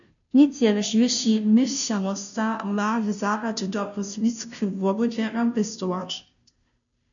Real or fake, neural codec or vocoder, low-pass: fake; codec, 16 kHz, 0.5 kbps, FunCodec, trained on Chinese and English, 25 frames a second; 7.2 kHz